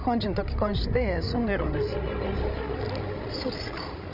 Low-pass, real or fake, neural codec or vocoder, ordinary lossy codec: 5.4 kHz; fake; codec, 16 kHz, 16 kbps, FreqCodec, larger model; none